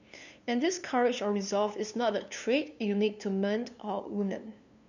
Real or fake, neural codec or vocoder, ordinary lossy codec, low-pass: fake; codec, 16 kHz, 2 kbps, FunCodec, trained on LibriTTS, 25 frames a second; none; 7.2 kHz